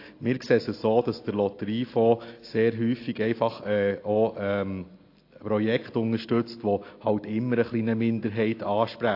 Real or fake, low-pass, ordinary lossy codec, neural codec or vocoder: real; 5.4 kHz; AAC, 32 kbps; none